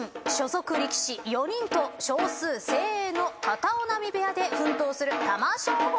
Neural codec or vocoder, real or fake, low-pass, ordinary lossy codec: none; real; none; none